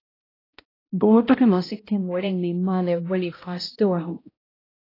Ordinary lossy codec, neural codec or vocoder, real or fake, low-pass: AAC, 24 kbps; codec, 16 kHz, 0.5 kbps, X-Codec, HuBERT features, trained on balanced general audio; fake; 5.4 kHz